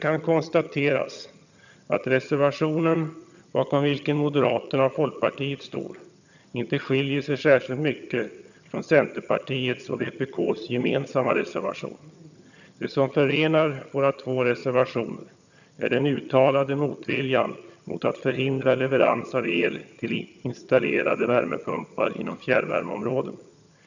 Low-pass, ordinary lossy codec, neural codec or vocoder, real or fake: 7.2 kHz; none; vocoder, 22.05 kHz, 80 mel bands, HiFi-GAN; fake